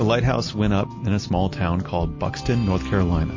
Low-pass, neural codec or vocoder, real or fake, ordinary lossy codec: 7.2 kHz; none; real; MP3, 32 kbps